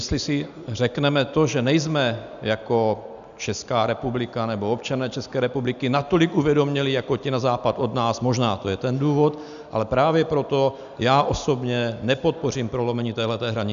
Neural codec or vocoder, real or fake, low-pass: none; real; 7.2 kHz